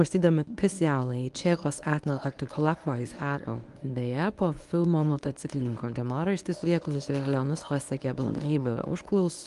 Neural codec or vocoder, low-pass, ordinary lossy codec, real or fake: codec, 24 kHz, 0.9 kbps, WavTokenizer, medium speech release version 1; 10.8 kHz; Opus, 32 kbps; fake